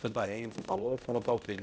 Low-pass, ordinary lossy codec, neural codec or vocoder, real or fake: none; none; codec, 16 kHz, 0.5 kbps, X-Codec, HuBERT features, trained on balanced general audio; fake